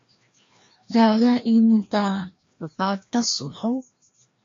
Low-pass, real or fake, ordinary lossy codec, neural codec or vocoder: 7.2 kHz; fake; MP3, 48 kbps; codec, 16 kHz, 1 kbps, FreqCodec, larger model